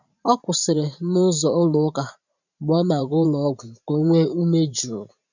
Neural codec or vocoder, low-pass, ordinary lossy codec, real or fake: vocoder, 44.1 kHz, 128 mel bands every 512 samples, BigVGAN v2; 7.2 kHz; none; fake